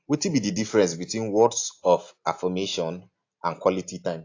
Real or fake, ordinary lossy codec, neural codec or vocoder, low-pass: real; AAC, 48 kbps; none; 7.2 kHz